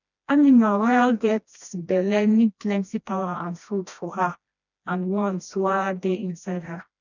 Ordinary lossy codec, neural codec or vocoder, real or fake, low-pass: none; codec, 16 kHz, 1 kbps, FreqCodec, smaller model; fake; 7.2 kHz